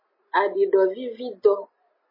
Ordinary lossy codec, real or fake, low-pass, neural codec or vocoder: MP3, 24 kbps; real; 5.4 kHz; none